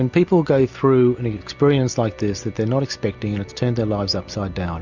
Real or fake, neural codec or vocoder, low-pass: real; none; 7.2 kHz